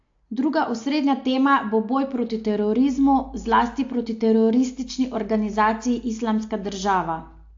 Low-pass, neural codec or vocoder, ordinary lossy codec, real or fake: 7.2 kHz; none; AAC, 48 kbps; real